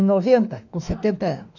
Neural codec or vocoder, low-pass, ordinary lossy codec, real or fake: autoencoder, 48 kHz, 32 numbers a frame, DAC-VAE, trained on Japanese speech; 7.2 kHz; none; fake